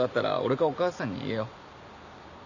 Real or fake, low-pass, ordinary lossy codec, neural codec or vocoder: real; 7.2 kHz; none; none